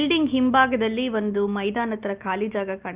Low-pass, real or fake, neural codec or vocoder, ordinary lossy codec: 3.6 kHz; real; none; Opus, 32 kbps